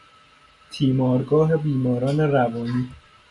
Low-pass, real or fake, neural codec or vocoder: 10.8 kHz; real; none